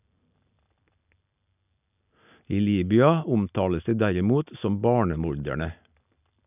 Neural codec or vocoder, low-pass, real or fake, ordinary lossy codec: none; 3.6 kHz; real; none